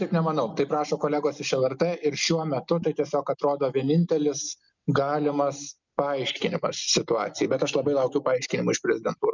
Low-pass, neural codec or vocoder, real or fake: 7.2 kHz; none; real